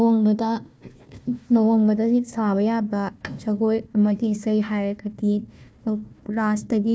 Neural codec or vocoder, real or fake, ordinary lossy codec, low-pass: codec, 16 kHz, 1 kbps, FunCodec, trained on Chinese and English, 50 frames a second; fake; none; none